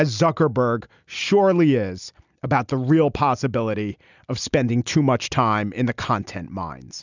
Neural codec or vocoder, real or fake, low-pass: none; real; 7.2 kHz